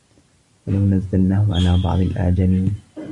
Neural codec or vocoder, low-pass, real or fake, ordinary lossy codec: none; 10.8 kHz; real; AAC, 64 kbps